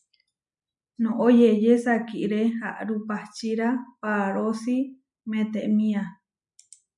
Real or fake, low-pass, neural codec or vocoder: real; 10.8 kHz; none